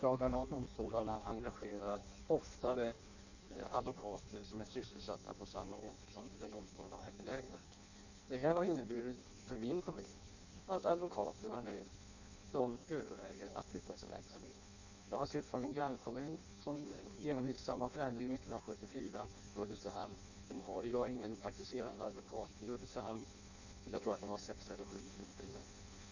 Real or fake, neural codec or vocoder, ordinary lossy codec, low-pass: fake; codec, 16 kHz in and 24 kHz out, 0.6 kbps, FireRedTTS-2 codec; none; 7.2 kHz